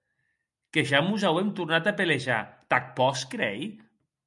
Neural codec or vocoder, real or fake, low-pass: none; real; 10.8 kHz